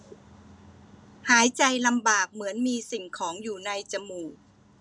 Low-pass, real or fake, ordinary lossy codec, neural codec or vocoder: none; real; none; none